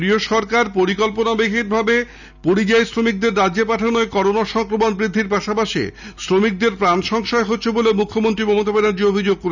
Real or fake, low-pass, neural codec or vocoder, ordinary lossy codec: real; 7.2 kHz; none; none